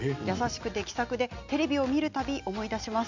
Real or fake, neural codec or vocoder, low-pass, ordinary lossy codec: real; none; 7.2 kHz; MP3, 64 kbps